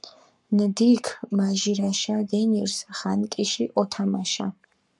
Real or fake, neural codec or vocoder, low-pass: fake; codec, 44.1 kHz, 7.8 kbps, Pupu-Codec; 10.8 kHz